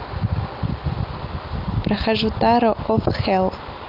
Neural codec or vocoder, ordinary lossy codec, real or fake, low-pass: none; Opus, 24 kbps; real; 5.4 kHz